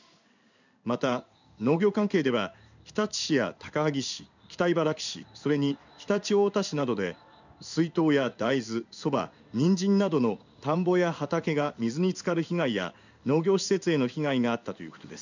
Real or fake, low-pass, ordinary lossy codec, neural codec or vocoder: fake; 7.2 kHz; none; codec, 16 kHz in and 24 kHz out, 1 kbps, XY-Tokenizer